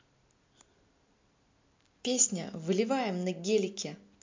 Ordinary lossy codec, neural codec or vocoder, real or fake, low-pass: MP3, 64 kbps; none; real; 7.2 kHz